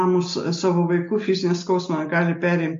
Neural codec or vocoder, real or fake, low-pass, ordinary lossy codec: none; real; 7.2 kHz; MP3, 48 kbps